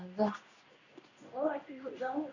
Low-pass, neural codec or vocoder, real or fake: 7.2 kHz; codec, 16 kHz in and 24 kHz out, 1 kbps, XY-Tokenizer; fake